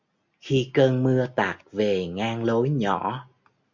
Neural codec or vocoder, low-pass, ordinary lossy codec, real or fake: none; 7.2 kHz; MP3, 48 kbps; real